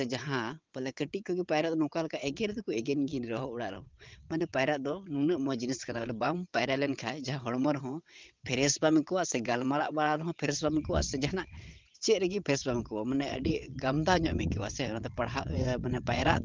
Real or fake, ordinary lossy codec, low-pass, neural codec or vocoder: fake; Opus, 24 kbps; 7.2 kHz; vocoder, 22.05 kHz, 80 mel bands, WaveNeXt